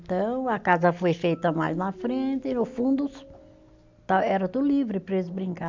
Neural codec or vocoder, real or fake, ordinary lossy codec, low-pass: none; real; MP3, 64 kbps; 7.2 kHz